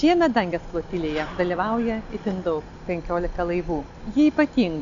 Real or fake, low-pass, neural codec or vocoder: real; 7.2 kHz; none